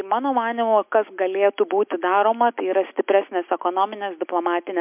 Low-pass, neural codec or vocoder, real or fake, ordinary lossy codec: 3.6 kHz; none; real; MP3, 32 kbps